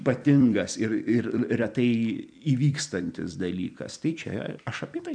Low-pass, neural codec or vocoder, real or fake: 9.9 kHz; vocoder, 44.1 kHz, 128 mel bands every 256 samples, BigVGAN v2; fake